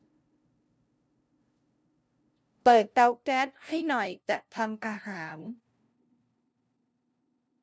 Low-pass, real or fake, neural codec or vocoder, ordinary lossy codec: none; fake; codec, 16 kHz, 0.5 kbps, FunCodec, trained on LibriTTS, 25 frames a second; none